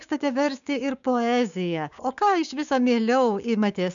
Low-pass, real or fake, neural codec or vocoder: 7.2 kHz; fake; codec, 16 kHz, 6 kbps, DAC